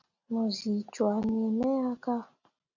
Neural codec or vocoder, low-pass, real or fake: none; 7.2 kHz; real